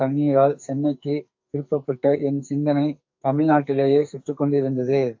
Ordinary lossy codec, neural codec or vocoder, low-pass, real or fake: AAC, 48 kbps; codec, 44.1 kHz, 2.6 kbps, SNAC; 7.2 kHz; fake